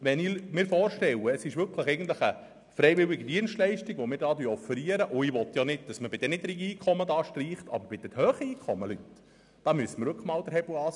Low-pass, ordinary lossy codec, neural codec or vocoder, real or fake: 10.8 kHz; none; none; real